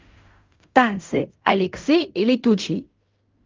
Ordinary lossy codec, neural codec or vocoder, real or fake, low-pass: Opus, 32 kbps; codec, 16 kHz in and 24 kHz out, 0.4 kbps, LongCat-Audio-Codec, fine tuned four codebook decoder; fake; 7.2 kHz